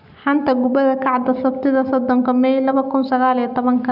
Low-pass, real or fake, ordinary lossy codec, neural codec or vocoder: 5.4 kHz; real; none; none